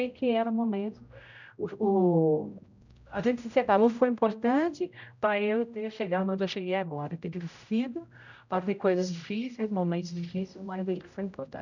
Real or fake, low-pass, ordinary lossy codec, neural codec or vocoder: fake; 7.2 kHz; none; codec, 16 kHz, 0.5 kbps, X-Codec, HuBERT features, trained on general audio